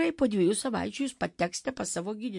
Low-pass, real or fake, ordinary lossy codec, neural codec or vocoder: 10.8 kHz; real; MP3, 64 kbps; none